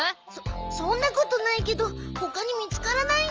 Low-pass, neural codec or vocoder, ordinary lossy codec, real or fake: 7.2 kHz; none; Opus, 24 kbps; real